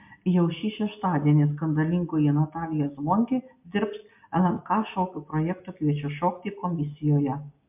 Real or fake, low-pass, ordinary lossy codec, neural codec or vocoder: real; 3.6 kHz; MP3, 32 kbps; none